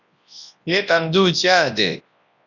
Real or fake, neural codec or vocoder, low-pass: fake; codec, 24 kHz, 0.9 kbps, WavTokenizer, large speech release; 7.2 kHz